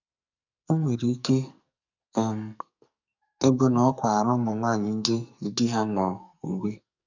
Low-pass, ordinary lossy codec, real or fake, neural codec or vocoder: 7.2 kHz; none; fake; codec, 44.1 kHz, 2.6 kbps, SNAC